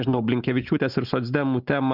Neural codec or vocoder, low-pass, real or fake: none; 5.4 kHz; real